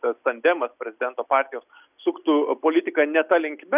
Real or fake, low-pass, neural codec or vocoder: real; 3.6 kHz; none